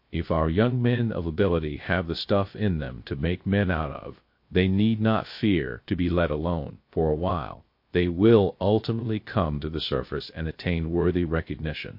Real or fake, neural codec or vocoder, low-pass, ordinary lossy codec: fake; codec, 16 kHz, 0.3 kbps, FocalCodec; 5.4 kHz; MP3, 32 kbps